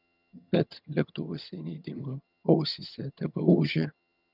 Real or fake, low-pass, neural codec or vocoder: fake; 5.4 kHz; vocoder, 22.05 kHz, 80 mel bands, HiFi-GAN